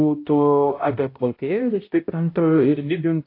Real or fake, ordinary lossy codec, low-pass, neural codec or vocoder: fake; AAC, 32 kbps; 5.4 kHz; codec, 16 kHz, 0.5 kbps, X-Codec, HuBERT features, trained on balanced general audio